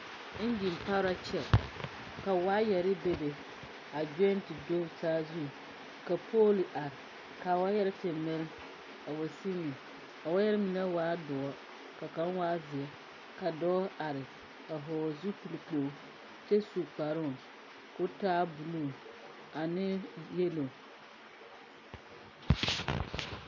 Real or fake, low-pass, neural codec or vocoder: real; 7.2 kHz; none